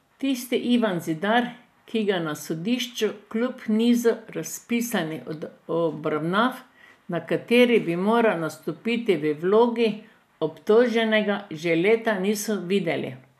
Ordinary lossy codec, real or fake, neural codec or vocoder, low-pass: none; real; none; 14.4 kHz